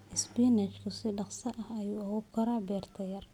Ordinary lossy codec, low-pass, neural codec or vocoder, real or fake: none; 19.8 kHz; none; real